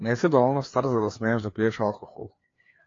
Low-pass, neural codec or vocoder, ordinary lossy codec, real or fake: 7.2 kHz; codec, 16 kHz, 2 kbps, FreqCodec, larger model; AAC, 32 kbps; fake